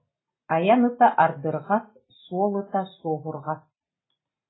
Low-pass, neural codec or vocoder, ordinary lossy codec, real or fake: 7.2 kHz; none; AAC, 16 kbps; real